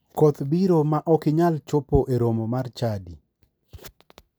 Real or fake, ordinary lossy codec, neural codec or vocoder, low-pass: real; none; none; none